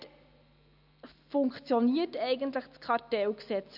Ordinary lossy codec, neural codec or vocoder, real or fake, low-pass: none; none; real; 5.4 kHz